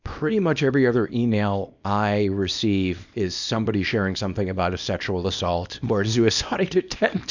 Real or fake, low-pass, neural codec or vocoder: fake; 7.2 kHz; codec, 24 kHz, 0.9 kbps, WavTokenizer, small release